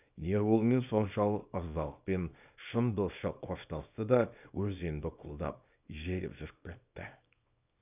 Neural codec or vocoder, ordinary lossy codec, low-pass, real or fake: codec, 24 kHz, 0.9 kbps, WavTokenizer, small release; none; 3.6 kHz; fake